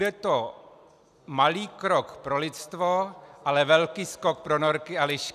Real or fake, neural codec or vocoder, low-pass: real; none; 14.4 kHz